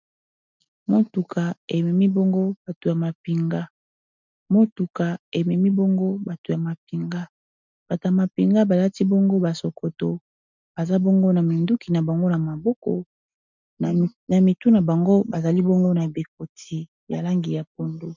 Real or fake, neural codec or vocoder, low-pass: real; none; 7.2 kHz